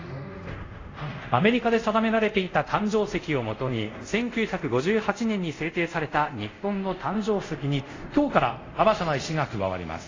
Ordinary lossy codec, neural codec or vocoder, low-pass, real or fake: AAC, 32 kbps; codec, 24 kHz, 0.5 kbps, DualCodec; 7.2 kHz; fake